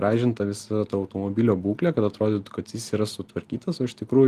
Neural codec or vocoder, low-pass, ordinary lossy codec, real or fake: none; 14.4 kHz; Opus, 24 kbps; real